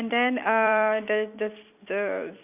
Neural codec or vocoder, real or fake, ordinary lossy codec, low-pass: vocoder, 44.1 kHz, 128 mel bands every 256 samples, BigVGAN v2; fake; none; 3.6 kHz